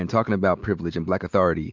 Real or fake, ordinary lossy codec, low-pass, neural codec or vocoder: real; MP3, 64 kbps; 7.2 kHz; none